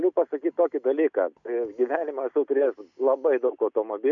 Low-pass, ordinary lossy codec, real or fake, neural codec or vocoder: 7.2 kHz; MP3, 64 kbps; real; none